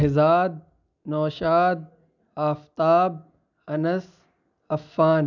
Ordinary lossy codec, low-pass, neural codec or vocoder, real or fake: none; 7.2 kHz; none; real